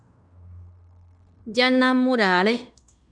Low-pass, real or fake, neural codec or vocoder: 9.9 kHz; fake; codec, 16 kHz in and 24 kHz out, 0.9 kbps, LongCat-Audio-Codec, fine tuned four codebook decoder